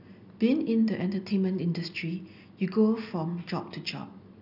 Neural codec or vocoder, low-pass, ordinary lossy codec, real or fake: none; 5.4 kHz; none; real